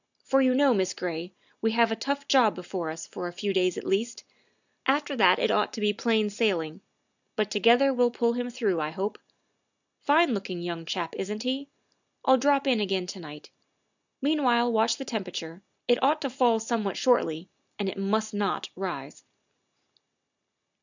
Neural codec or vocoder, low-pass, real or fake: none; 7.2 kHz; real